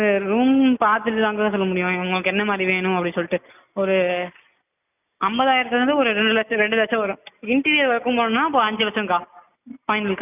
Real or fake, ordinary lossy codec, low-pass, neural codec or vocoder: real; none; 3.6 kHz; none